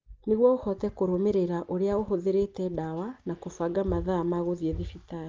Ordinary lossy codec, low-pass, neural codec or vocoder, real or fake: Opus, 32 kbps; 7.2 kHz; none; real